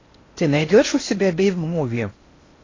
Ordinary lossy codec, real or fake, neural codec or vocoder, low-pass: AAC, 32 kbps; fake; codec, 16 kHz in and 24 kHz out, 0.6 kbps, FocalCodec, streaming, 4096 codes; 7.2 kHz